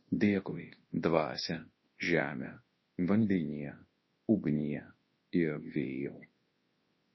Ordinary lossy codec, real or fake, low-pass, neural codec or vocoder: MP3, 24 kbps; fake; 7.2 kHz; codec, 24 kHz, 0.9 kbps, WavTokenizer, large speech release